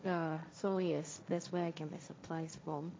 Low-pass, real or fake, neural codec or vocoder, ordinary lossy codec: none; fake; codec, 16 kHz, 1.1 kbps, Voila-Tokenizer; none